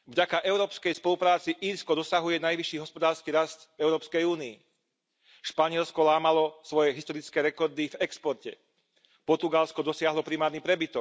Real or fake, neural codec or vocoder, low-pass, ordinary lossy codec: real; none; none; none